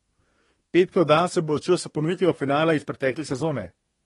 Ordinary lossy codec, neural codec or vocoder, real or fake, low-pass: AAC, 32 kbps; codec, 24 kHz, 1 kbps, SNAC; fake; 10.8 kHz